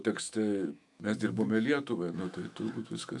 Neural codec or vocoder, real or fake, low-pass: autoencoder, 48 kHz, 128 numbers a frame, DAC-VAE, trained on Japanese speech; fake; 10.8 kHz